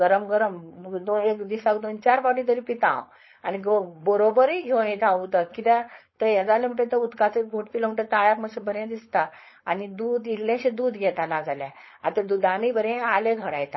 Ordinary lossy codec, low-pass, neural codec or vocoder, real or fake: MP3, 24 kbps; 7.2 kHz; codec, 16 kHz, 4.8 kbps, FACodec; fake